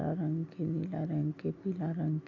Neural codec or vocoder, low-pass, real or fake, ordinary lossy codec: none; 7.2 kHz; real; none